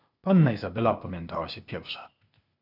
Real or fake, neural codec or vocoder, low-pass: fake; codec, 16 kHz, 0.8 kbps, ZipCodec; 5.4 kHz